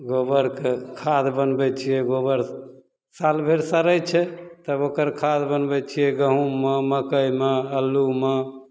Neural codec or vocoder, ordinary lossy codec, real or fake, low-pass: none; none; real; none